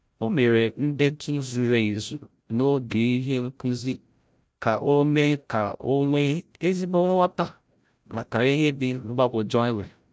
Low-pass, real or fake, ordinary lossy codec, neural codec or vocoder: none; fake; none; codec, 16 kHz, 0.5 kbps, FreqCodec, larger model